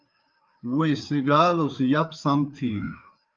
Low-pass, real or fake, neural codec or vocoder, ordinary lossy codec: 7.2 kHz; fake; codec, 16 kHz, 4 kbps, FreqCodec, larger model; Opus, 24 kbps